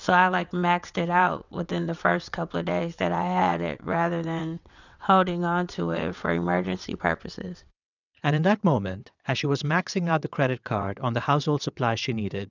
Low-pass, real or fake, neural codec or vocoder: 7.2 kHz; fake; vocoder, 22.05 kHz, 80 mel bands, WaveNeXt